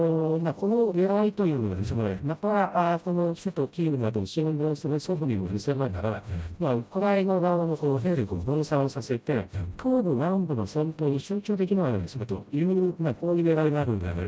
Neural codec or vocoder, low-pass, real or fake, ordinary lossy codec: codec, 16 kHz, 0.5 kbps, FreqCodec, smaller model; none; fake; none